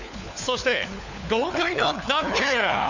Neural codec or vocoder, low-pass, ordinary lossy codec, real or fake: codec, 16 kHz, 4 kbps, FunCodec, trained on LibriTTS, 50 frames a second; 7.2 kHz; none; fake